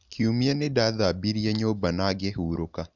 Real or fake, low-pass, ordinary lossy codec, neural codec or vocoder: real; 7.2 kHz; none; none